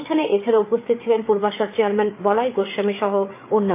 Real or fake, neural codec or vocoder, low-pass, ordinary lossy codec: fake; codec, 16 kHz, 8 kbps, FreqCodec, larger model; 3.6 kHz; AAC, 24 kbps